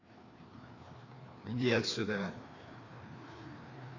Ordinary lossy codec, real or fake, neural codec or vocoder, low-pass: AAC, 32 kbps; fake; codec, 16 kHz, 2 kbps, FreqCodec, larger model; 7.2 kHz